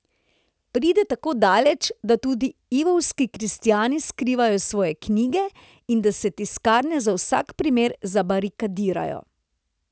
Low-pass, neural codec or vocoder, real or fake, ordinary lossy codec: none; none; real; none